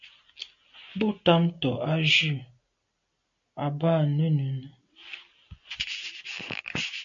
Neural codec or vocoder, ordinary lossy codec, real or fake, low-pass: none; AAC, 64 kbps; real; 7.2 kHz